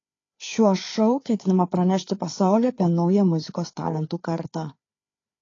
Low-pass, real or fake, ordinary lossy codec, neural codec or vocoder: 7.2 kHz; fake; AAC, 32 kbps; codec, 16 kHz, 4 kbps, FreqCodec, larger model